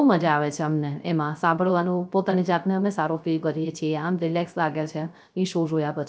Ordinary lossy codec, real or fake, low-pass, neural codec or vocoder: none; fake; none; codec, 16 kHz, 0.3 kbps, FocalCodec